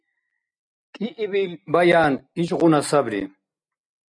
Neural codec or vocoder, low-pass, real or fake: none; 9.9 kHz; real